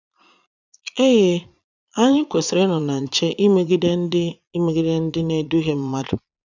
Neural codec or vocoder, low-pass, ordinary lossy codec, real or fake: vocoder, 22.05 kHz, 80 mel bands, Vocos; 7.2 kHz; none; fake